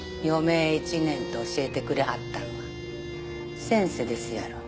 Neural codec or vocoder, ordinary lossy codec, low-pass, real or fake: none; none; none; real